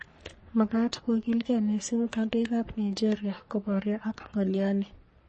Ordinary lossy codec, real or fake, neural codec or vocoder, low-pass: MP3, 32 kbps; fake; codec, 44.1 kHz, 3.4 kbps, Pupu-Codec; 10.8 kHz